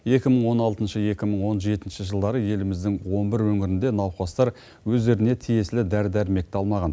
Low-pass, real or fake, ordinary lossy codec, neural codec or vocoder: none; real; none; none